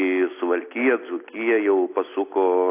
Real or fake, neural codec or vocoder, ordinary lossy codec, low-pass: real; none; AAC, 24 kbps; 3.6 kHz